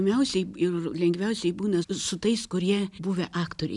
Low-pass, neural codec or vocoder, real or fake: 10.8 kHz; none; real